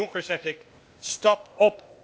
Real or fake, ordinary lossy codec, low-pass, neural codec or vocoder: fake; none; none; codec, 16 kHz, 0.8 kbps, ZipCodec